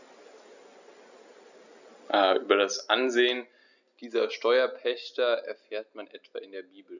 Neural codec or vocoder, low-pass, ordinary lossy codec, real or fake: none; 7.2 kHz; none; real